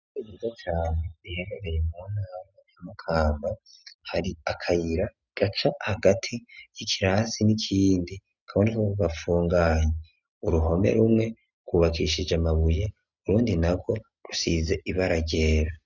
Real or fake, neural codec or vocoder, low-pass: real; none; 7.2 kHz